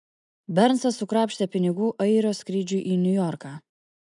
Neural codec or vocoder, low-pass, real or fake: none; 10.8 kHz; real